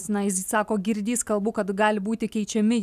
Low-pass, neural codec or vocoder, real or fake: 14.4 kHz; none; real